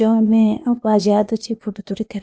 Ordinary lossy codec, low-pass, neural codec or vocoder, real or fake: none; none; codec, 16 kHz, 0.8 kbps, ZipCodec; fake